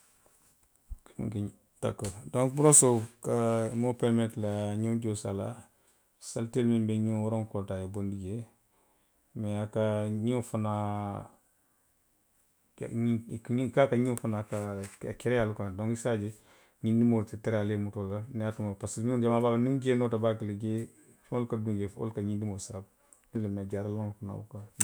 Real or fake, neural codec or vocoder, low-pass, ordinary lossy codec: fake; autoencoder, 48 kHz, 128 numbers a frame, DAC-VAE, trained on Japanese speech; none; none